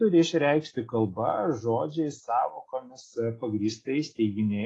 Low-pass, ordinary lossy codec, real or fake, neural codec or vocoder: 10.8 kHz; AAC, 32 kbps; real; none